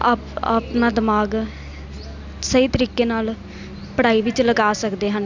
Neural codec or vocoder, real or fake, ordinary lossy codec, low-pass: none; real; none; 7.2 kHz